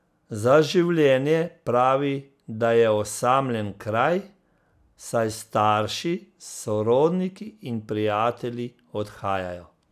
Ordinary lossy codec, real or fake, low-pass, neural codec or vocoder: none; real; 14.4 kHz; none